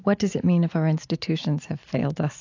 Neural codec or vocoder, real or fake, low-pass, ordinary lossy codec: none; real; 7.2 kHz; AAC, 48 kbps